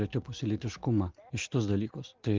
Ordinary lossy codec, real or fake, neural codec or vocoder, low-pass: Opus, 16 kbps; real; none; 7.2 kHz